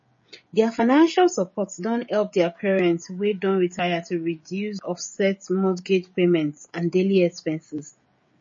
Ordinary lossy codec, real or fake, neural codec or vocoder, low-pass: MP3, 32 kbps; fake; codec, 16 kHz, 16 kbps, FreqCodec, smaller model; 7.2 kHz